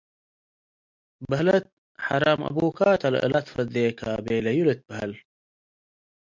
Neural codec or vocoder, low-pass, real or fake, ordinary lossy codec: none; 7.2 kHz; real; MP3, 64 kbps